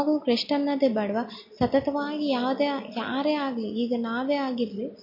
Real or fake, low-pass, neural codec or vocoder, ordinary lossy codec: real; 5.4 kHz; none; MP3, 32 kbps